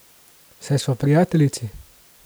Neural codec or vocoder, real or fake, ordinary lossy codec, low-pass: none; real; none; none